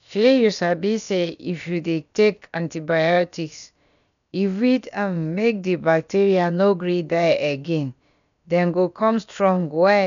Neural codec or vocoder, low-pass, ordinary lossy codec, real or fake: codec, 16 kHz, about 1 kbps, DyCAST, with the encoder's durations; 7.2 kHz; none; fake